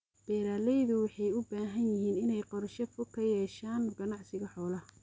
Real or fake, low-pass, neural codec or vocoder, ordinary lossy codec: real; none; none; none